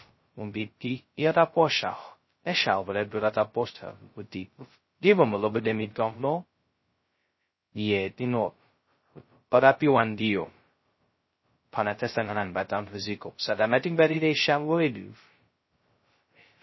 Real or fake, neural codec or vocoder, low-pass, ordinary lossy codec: fake; codec, 16 kHz, 0.2 kbps, FocalCodec; 7.2 kHz; MP3, 24 kbps